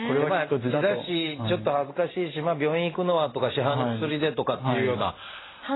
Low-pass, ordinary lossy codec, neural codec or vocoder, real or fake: 7.2 kHz; AAC, 16 kbps; none; real